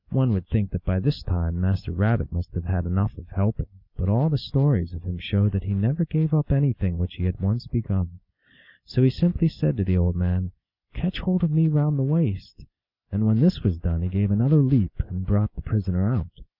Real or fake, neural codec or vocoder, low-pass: real; none; 5.4 kHz